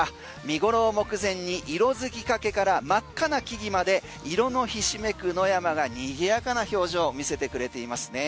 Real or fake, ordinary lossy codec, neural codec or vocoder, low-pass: real; none; none; none